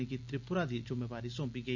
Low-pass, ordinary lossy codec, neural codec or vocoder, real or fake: 7.2 kHz; MP3, 64 kbps; none; real